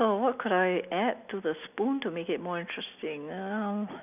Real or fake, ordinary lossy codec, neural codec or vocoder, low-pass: real; none; none; 3.6 kHz